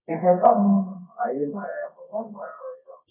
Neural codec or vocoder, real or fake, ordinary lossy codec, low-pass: codec, 24 kHz, 0.9 kbps, WavTokenizer, medium music audio release; fake; none; 3.6 kHz